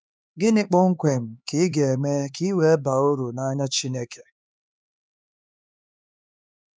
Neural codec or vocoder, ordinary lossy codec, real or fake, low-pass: codec, 16 kHz, 4 kbps, X-Codec, HuBERT features, trained on LibriSpeech; none; fake; none